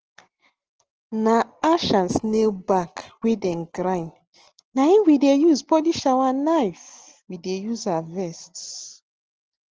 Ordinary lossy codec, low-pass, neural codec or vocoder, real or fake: Opus, 16 kbps; 7.2 kHz; none; real